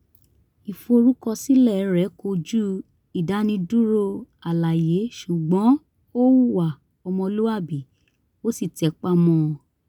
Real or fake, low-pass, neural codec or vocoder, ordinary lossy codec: real; 19.8 kHz; none; none